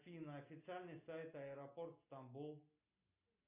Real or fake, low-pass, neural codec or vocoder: real; 3.6 kHz; none